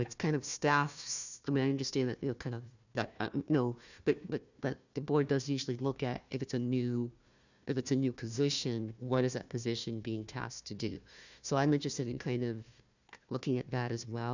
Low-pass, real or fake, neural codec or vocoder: 7.2 kHz; fake; codec, 16 kHz, 1 kbps, FunCodec, trained on Chinese and English, 50 frames a second